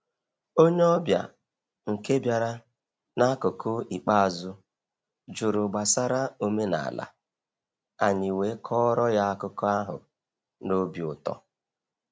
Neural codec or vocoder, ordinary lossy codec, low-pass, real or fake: none; none; none; real